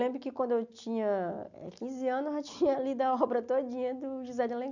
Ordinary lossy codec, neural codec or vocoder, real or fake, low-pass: none; none; real; 7.2 kHz